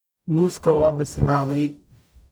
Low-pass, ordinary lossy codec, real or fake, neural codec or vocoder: none; none; fake; codec, 44.1 kHz, 0.9 kbps, DAC